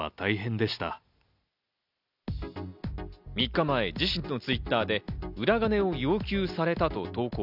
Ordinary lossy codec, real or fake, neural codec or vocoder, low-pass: none; real; none; 5.4 kHz